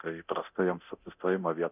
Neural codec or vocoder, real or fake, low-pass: codec, 24 kHz, 0.9 kbps, DualCodec; fake; 3.6 kHz